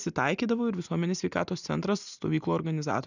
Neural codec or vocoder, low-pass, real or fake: none; 7.2 kHz; real